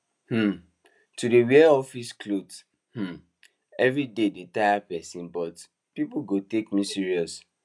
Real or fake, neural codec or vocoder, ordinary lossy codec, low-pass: real; none; none; none